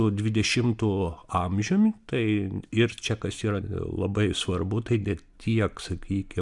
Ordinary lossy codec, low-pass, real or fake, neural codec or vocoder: MP3, 96 kbps; 10.8 kHz; real; none